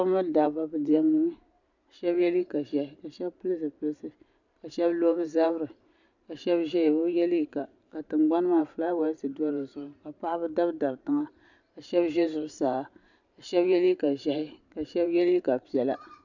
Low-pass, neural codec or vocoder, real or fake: 7.2 kHz; vocoder, 44.1 kHz, 128 mel bands every 512 samples, BigVGAN v2; fake